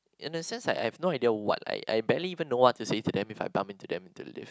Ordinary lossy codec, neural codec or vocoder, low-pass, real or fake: none; none; none; real